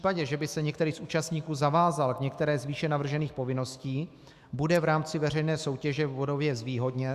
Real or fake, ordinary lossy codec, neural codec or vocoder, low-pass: fake; Opus, 64 kbps; autoencoder, 48 kHz, 128 numbers a frame, DAC-VAE, trained on Japanese speech; 14.4 kHz